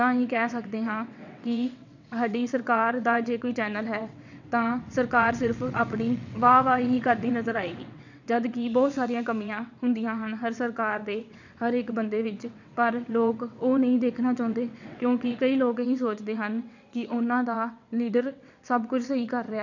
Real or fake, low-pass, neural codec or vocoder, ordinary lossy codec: fake; 7.2 kHz; vocoder, 22.05 kHz, 80 mel bands, WaveNeXt; none